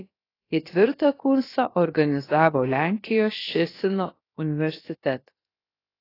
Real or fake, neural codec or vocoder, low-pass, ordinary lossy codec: fake; codec, 16 kHz, about 1 kbps, DyCAST, with the encoder's durations; 5.4 kHz; AAC, 24 kbps